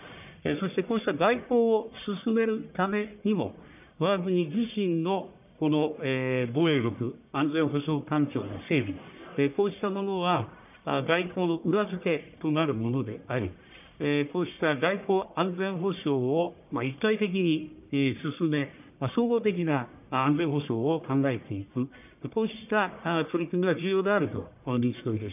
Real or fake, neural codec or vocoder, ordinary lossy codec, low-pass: fake; codec, 44.1 kHz, 1.7 kbps, Pupu-Codec; none; 3.6 kHz